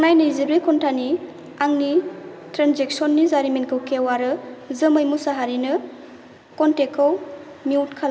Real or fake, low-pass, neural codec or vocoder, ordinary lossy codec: real; none; none; none